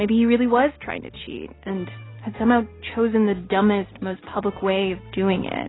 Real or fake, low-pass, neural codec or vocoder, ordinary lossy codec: real; 7.2 kHz; none; AAC, 16 kbps